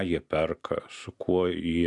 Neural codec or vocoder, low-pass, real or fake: none; 10.8 kHz; real